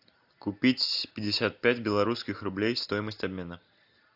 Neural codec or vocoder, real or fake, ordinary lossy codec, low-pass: none; real; AAC, 48 kbps; 5.4 kHz